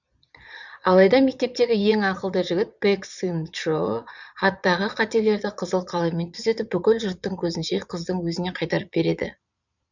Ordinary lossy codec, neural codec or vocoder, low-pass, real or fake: none; vocoder, 22.05 kHz, 80 mel bands, Vocos; 7.2 kHz; fake